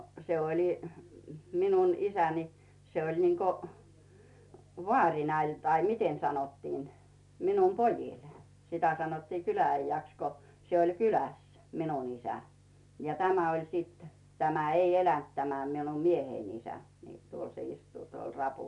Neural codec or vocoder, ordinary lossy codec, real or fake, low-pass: none; none; real; 10.8 kHz